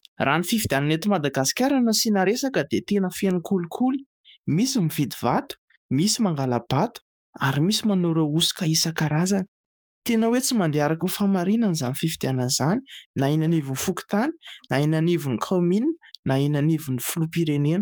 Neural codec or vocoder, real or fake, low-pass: codec, 44.1 kHz, 7.8 kbps, DAC; fake; 19.8 kHz